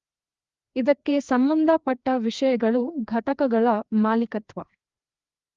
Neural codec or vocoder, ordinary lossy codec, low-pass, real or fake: codec, 16 kHz, 2 kbps, FreqCodec, larger model; Opus, 32 kbps; 7.2 kHz; fake